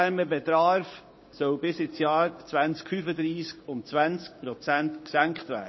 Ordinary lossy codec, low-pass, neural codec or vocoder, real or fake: MP3, 24 kbps; 7.2 kHz; codec, 16 kHz, 6 kbps, DAC; fake